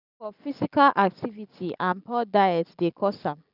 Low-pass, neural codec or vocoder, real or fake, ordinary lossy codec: 5.4 kHz; none; real; none